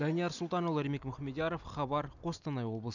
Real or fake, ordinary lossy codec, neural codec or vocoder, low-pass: real; none; none; 7.2 kHz